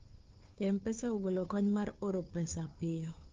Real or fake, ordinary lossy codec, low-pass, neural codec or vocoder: fake; Opus, 32 kbps; 7.2 kHz; codec, 16 kHz, 2 kbps, FunCodec, trained on Chinese and English, 25 frames a second